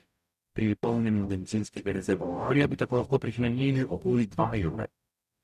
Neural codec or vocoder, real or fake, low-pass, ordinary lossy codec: codec, 44.1 kHz, 0.9 kbps, DAC; fake; 14.4 kHz; none